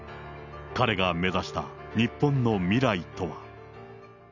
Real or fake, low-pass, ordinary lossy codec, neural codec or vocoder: real; 7.2 kHz; none; none